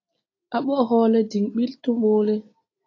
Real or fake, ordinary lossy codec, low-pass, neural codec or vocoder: real; AAC, 32 kbps; 7.2 kHz; none